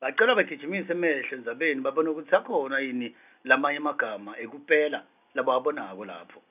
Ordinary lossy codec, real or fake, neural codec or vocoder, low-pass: none; real; none; 3.6 kHz